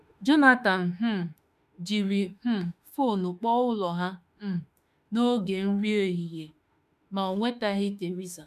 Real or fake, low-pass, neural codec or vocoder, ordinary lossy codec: fake; 14.4 kHz; autoencoder, 48 kHz, 32 numbers a frame, DAC-VAE, trained on Japanese speech; none